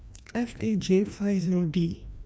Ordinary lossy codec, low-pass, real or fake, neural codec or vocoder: none; none; fake; codec, 16 kHz, 1 kbps, FreqCodec, larger model